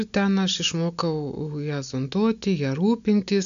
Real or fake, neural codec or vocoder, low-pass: real; none; 7.2 kHz